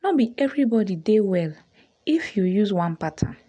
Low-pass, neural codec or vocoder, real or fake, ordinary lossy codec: 9.9 kHz; none; real; none